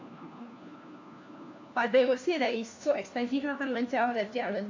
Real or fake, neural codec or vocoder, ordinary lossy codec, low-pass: fake; codec, 16 kHz, 1 kbps, FunCodec, trained on LibriTTS, 50 frames a second; AAC, 48 kbps; 7.2 kHz